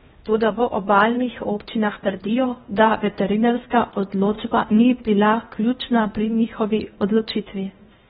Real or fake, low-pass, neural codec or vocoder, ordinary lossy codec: fake; 10.8 kHz; codec, 16 kHz in and 24 kHz out, 0.8 kbps, FocalCodec, streaming, 65536 codes; AAC, 16 kbps